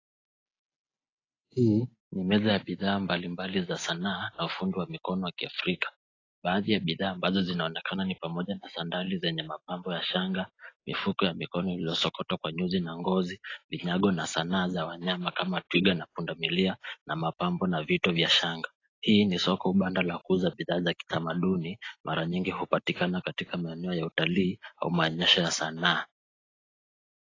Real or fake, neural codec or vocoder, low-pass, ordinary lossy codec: real; none; 7.2 kHz; AAC, 32 kbps